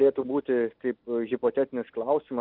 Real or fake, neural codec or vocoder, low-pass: real; none; 5.4 kHz